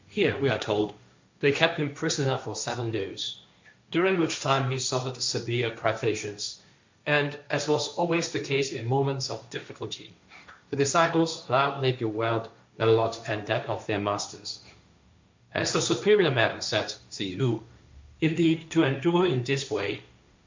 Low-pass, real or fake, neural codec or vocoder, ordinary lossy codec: 7.2 kHz; fake; codec, 16 kHz, 1.1 kbps, Voila-Tokenizer; MP3, 64 kbps